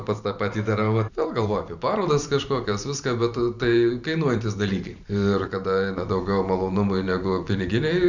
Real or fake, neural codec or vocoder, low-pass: fake; vocoder, 44.1 kHz, 128 mel bands every 512 samples, BigVGAN v2; 7.2 kHz